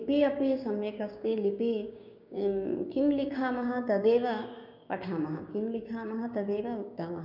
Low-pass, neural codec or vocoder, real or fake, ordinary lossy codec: 5.4 kHz; codec, 16 kHz, 6 kbps, DAC; fake; none